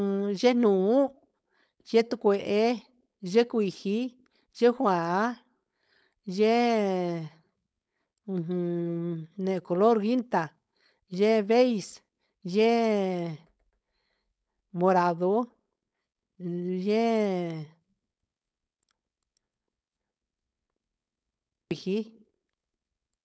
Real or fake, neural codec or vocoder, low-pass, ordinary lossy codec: fake; codec, 16 kHz, 4.8 kbps, FACodec; none; none